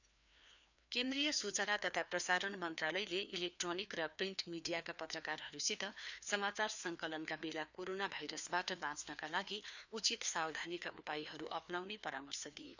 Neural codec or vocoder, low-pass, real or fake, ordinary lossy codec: codec, 16 kHz, 2 kbps, FreqCodec, larger model; 7.2 kHz; fake; none